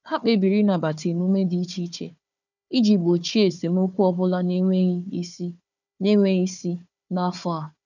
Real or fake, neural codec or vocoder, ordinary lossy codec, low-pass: fake; codec, 16 kHz, 4 kbps, FunCodec, trained on Chinese and English, 50 frames a second; none; 7.2 kHz